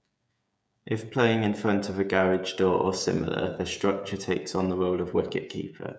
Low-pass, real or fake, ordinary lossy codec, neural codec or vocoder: none; fake; none; codec, 16 kHz, 16 kbps, FreqCodec, smaller model